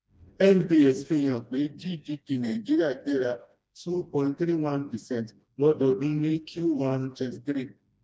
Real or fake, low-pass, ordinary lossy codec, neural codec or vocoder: fake; none; none; codec, 16 kHz, 1 kbps, FreqCodec, smaller model